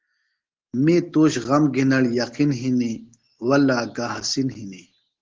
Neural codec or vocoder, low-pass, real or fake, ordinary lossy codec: none; 7.2 kHz; real; Opus, 16 kbps